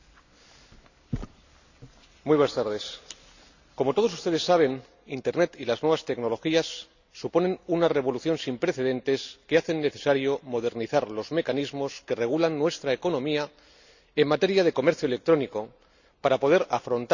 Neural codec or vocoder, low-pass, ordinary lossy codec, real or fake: none; 7.2 kHz; none; real